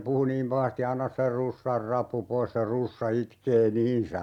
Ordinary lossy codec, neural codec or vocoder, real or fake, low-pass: none; none; real; 19.8 kHz